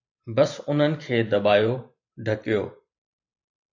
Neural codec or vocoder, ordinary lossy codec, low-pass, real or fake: vocoder, 44.1 kHz, 128 mel bands every 512 samples, BigVGAN v2; AAC, 48 kbps; 7.2 kHz; fake